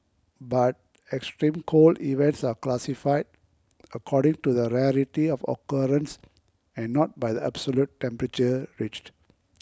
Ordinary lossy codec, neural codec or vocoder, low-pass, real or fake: none; none; none; real